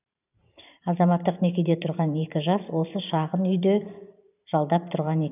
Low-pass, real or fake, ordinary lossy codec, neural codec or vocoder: 3.6 kHz; fake; none; vocoder, 44.1 kHz, 128 mel bands every 512 samples, BigVGAN v2